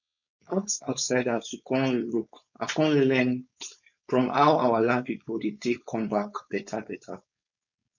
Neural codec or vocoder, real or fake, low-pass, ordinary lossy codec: codec, 16 kHz, 4.8 kbps, FACodec; fake; 7.2 kHz; none